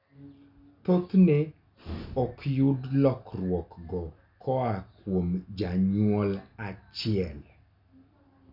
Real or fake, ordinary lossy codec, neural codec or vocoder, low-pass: real; none; none; 5.4 kHz